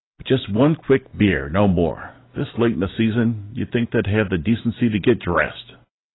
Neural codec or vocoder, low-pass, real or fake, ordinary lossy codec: none; 7.2 kHz; real; AAC, 16 kbps